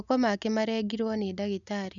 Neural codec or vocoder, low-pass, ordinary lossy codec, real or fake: none; 7.2 kHz; none; real